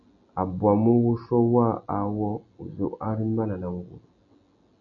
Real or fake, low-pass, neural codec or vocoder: real; 7.2 kHz; none